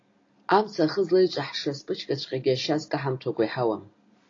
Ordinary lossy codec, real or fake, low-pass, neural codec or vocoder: AAC, 32 kbps; real; 7.2 kHz; none